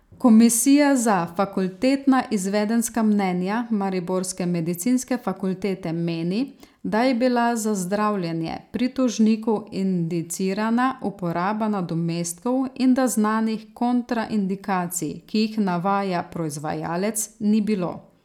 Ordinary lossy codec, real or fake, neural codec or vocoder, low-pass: none; real; none; 19.8 kHz